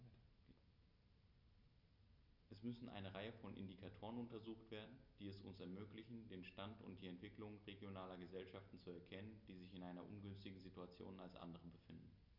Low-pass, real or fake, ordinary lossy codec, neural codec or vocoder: 5.4 kHz; real; none; none